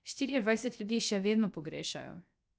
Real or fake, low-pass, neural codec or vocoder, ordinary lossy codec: fake; none; codec, 16 kHz, 0.3 kbps, FocalCodec; none